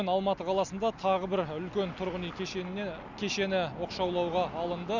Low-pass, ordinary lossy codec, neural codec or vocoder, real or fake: 7.2 kHz; none; none; real